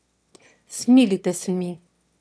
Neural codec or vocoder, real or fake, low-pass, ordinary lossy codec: autoencoder, 22.05 kHz, a latent of 192 numbers a frame, VITS, trained on one speaker; fake; none; none